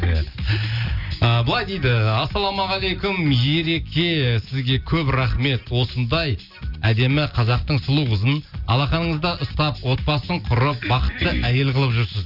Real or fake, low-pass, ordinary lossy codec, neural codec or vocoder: real; 5.4 kHz; Opus, 64 kbps; none